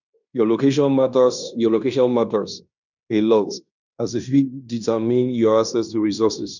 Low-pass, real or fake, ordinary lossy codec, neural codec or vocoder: 7.2 kHz; fake; none; codec, 16 kHz in and 24 kHz out, 0.9 kbps, LongCat-Audio-Codec, fine tuned four codebook decoder